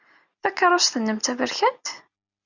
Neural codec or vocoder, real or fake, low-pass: none; real; 7.2 kHz